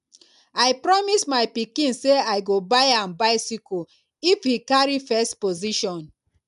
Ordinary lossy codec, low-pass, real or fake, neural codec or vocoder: MP3, 96 kbps; 10.8 kHz; real; none